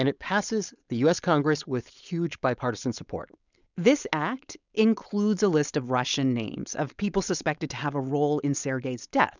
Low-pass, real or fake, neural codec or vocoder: 7.2 kHz; fake; codec, 16 kHz, 4.8 kbps, FACodec